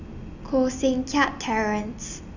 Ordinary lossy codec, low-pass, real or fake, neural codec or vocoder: none; 7.2 kHz; real; none